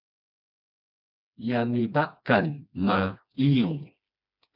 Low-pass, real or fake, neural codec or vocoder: 5.4 kHz; fake; codec, 16 kHz, 2 kbps, FreqCodec, smaller model